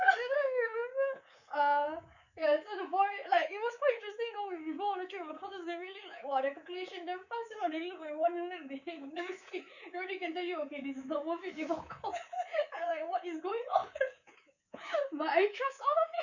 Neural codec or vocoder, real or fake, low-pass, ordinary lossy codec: codec, 24 kHz, 3.1 kbps, DualCodec; fake; 7.2 kHz; none